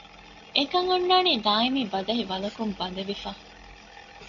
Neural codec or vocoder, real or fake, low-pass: none; real; 7.2 kHz